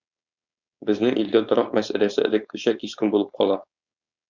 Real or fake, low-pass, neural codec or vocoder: fake; 7.2 kHz; codec, 16 kHz, 4.8 kbps, FACodec